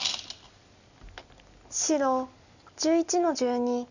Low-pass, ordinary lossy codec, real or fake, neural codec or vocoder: 7.2 kHz; none; real; none